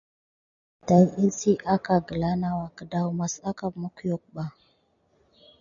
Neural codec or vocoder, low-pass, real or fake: none; 7.2 kHz; real